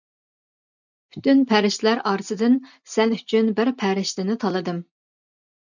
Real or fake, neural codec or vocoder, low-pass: real; none; 7.2 kHz